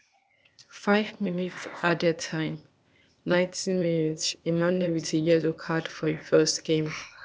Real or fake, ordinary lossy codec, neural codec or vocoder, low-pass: fake; none; codec, 16 kHz, 0.8 kbps, ZipCodec; none